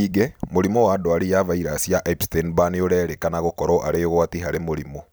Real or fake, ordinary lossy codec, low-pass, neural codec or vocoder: fake; none; none; vocoder, 44.1 kHz, 128 mel bands every 256 samples, BigVGAN v2